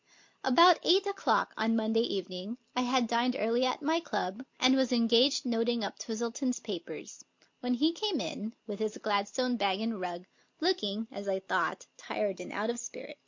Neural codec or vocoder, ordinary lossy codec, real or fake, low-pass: none; MP3, 48 kbps; real; 7.2 kHz